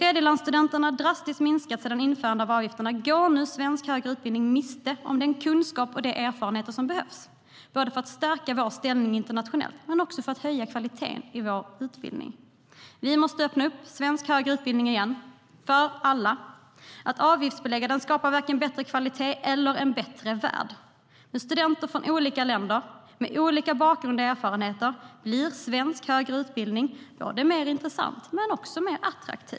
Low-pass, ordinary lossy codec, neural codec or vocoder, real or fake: none; none; none; real